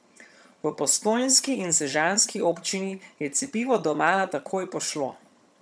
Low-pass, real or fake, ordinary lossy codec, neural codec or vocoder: none; fake; none; vocoder, 22.05 kHz, 80 mel bands, HiFi-GAN